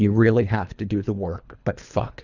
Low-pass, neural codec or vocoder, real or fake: 7.2 kHz; codec, 24 kHz, 3 kbps, HILCodec; fake